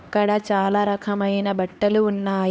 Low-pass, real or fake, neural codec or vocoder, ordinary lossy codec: none; fake; codec, 16 kHz, 2 kbps, X-Codec, HuBERT features, trained on LibriSpeech; none